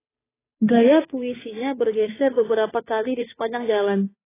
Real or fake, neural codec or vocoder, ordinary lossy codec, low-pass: fake; codec, 16 kHz, 2 kbps, FunCodec, trained on Chinese and English, 25 frames a second; AAC, 16 kbps; 3.6 kHz